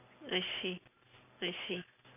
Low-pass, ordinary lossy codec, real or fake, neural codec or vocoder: 3.6 kHz; none; real; none